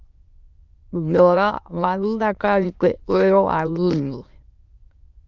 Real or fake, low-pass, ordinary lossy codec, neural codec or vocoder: fake; 7.2 kHz; Opus, 32 kbps; autoencoder, 22.05 kHz, a latent of 192 numbers a frame, VITS, trained on many speakers